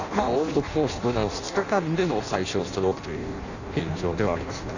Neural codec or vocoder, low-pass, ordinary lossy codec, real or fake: codec, 16 kHz in and 24 kHz out, 0.6 kbps, FireRedTTS-2 codec; 7.2 kHz; none; fake